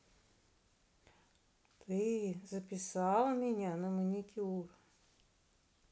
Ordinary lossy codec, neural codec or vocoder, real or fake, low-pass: none; none; real; none